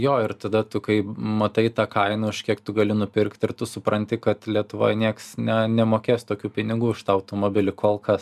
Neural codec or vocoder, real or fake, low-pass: none; real; 14.4 kHz